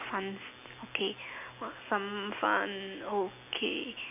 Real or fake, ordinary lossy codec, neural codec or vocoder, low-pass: real; none; none; 3.6 kHz